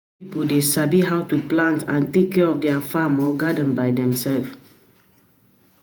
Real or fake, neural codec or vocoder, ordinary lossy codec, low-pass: fake; vocoder, 48 kHz, 128 mel bands, Vocos; none; none